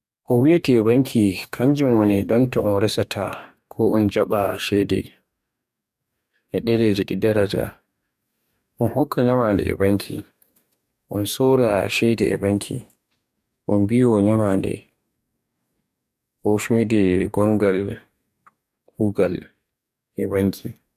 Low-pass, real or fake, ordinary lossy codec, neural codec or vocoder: 14.4 kHz; fake; none; codec, 44.1 kHz, 2.6 kbps, DAC